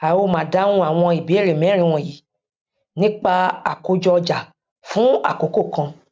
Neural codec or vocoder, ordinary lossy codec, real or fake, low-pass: none; none; real; none